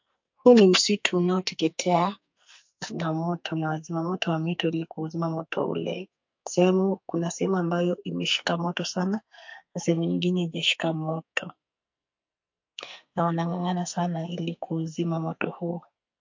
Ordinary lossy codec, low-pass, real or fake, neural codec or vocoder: MP3, 48 kbps; 7.2 kHz; fake; codec, 44.1 kHz, 2.6 kbps, SNAC